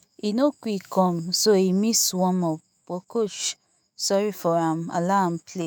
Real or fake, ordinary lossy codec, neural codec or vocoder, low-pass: fake; none; autoencoder, 48 kHz, 128 numbers a frame, DAC-VAE, trained on Japanese speech; none